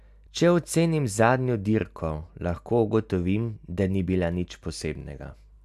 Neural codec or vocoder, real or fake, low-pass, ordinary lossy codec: none; real; 14.4 kHz; none